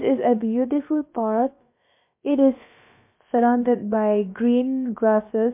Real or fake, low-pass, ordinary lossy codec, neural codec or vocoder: fake; 3.6 kHz; MP3, 32 kbps; codec, 16 kHz, about 1 kbps, DyCAST, with the encoder's durations